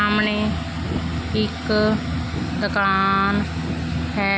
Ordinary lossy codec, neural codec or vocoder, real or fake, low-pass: none; none; real; none